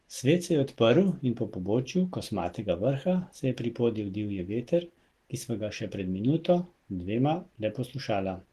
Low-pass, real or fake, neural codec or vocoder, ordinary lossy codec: 14.4 kHz; real; none; Opus, 16 kbps